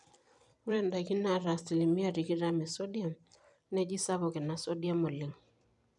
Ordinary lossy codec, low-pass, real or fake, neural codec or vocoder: none; 10.8 kHz; fake; vocoder, 44.1 kHz, 128 mel bands every 256 samples, BigVGAN v2